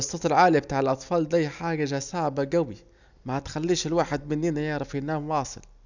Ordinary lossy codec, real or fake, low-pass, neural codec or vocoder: none; real; 7.2 kHz; none